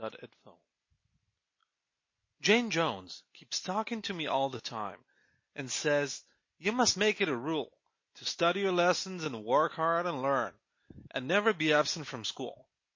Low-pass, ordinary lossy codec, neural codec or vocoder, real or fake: 7.2 kHz; MP3, 32 kbps; none; real